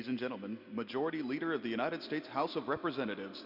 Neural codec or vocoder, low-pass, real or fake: none; 5.4 kHz; real